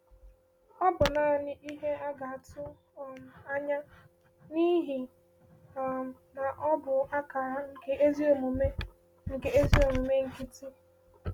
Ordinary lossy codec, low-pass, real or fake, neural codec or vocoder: MP3, 96 kbps; 19.8 kHz; real; none